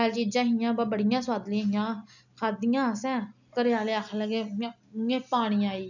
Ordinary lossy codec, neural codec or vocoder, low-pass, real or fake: none; none; 7.2 kHz; real